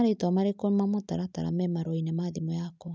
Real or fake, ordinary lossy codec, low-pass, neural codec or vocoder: real; none; none; none